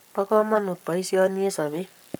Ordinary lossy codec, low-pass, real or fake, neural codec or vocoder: none; none; fake; codec, 44.1 kHz, 7.8 kbps, Pupu-Codec